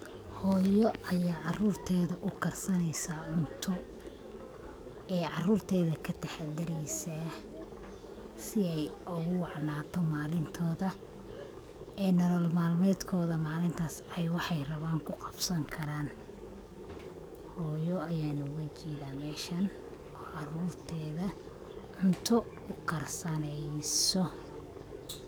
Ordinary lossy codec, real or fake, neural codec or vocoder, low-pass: none; fake; codec, 44.1 kHz, 7.8 kbps, DAC; none